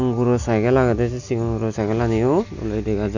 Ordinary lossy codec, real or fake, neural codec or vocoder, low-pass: none; real; none; 7.2 kHz